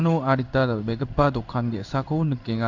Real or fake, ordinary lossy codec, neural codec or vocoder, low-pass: fake; none; codec, 16 kHz in and 24 kHz out, 1 kbps, XY-Tokenizer; 7.2 kHz